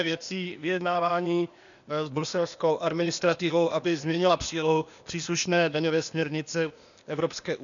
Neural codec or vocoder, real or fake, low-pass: codec, 16 kHz, 0.8 kbps, ZipCodec; fake; 7.2 kHz